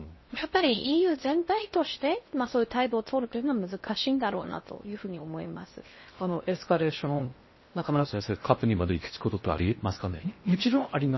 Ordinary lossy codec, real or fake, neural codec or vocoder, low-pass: MP3, 24 kbps; fake; codec, 16 kHz in and 24 kHz out, 0.6 kbps, FocalCodec, streaming, 4096 codes; 7.2 kHz